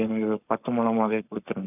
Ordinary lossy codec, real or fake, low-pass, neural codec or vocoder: none; fake; 3.6 kHz; codec, 44.1 kHz, 7.8 kbps, Pupu-Codec